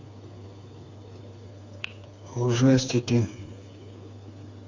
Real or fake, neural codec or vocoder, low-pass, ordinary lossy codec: fake; codec, 16 kHz, 4 kbps, FreqCodec, smaller model; 7.2 kHz; AAC, 48 kbps